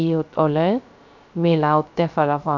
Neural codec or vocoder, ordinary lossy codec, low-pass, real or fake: codec, 16 kHz, 0.3 kbps, FocalCodec; none; 7.2 kHz; fake